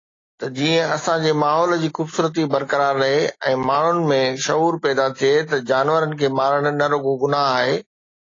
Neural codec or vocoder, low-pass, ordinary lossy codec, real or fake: none; 7.2 kHz; AAC, 32 kbps; real